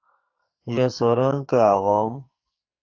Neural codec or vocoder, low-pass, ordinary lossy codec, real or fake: codec, 44.1 kHz, 2.6 kbps, SNAC; 7.2 kHz; AAC, 48 kbps; fake